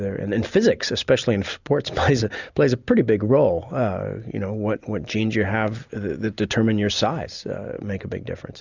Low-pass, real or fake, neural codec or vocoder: 7.2 kHz; real; none